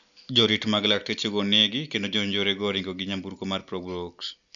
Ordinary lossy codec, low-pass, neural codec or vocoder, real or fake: none; 7.2 kHz; none; real